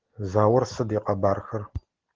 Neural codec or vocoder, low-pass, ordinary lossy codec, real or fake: none; 7.2 kHz; Opus, 16 kbps; real